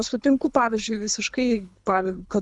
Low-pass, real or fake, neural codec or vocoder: 10.8 kHz; fake; codec, 24 kHz, 3 kbps, HILCodec